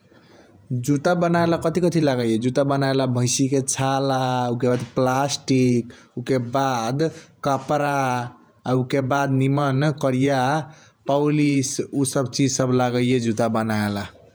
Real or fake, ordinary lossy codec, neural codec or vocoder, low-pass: fake; none; vocoder, 48 kHz, 128 mel bands, Vocos; none